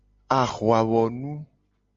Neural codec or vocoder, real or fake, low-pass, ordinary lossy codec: none; real; 7.2 kHz; Opus, 32 kbps